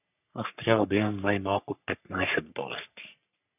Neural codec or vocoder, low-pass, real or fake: codec, 44.1 kHz, 3.4 kbps, Pupu-Codec; 3.6 kHz; fake